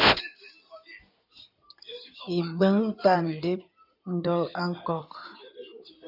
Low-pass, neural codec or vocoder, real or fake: 5.4 kHz; codec, 16 kHz in and 24 kHz out, 2.2 kbps, FireRedTTS-2 codec; fake